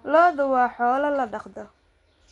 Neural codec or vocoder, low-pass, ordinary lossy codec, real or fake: none; 10.8 kHz; none; real